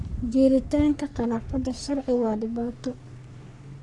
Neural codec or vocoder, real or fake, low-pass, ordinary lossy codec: codec, 44.1 kHz, 3.4 kbps, Pupu-Codec; fake; 10.8 kHz; none